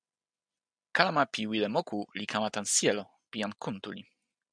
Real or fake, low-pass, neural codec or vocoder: real; 9.9 kHz; none